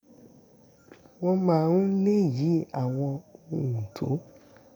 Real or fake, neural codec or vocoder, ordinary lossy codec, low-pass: real; none; none; 19.8 kHz